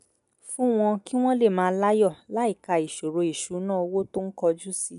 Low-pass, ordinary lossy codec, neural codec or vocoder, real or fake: 10.8 kHz; none; none; real